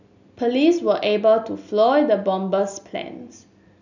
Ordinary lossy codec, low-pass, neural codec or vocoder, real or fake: none; 7.2 kHz; none; real